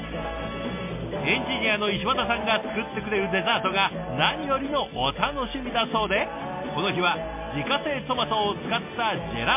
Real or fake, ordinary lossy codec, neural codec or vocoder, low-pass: real; MP3, 32 kbps; none; 3.6 kHz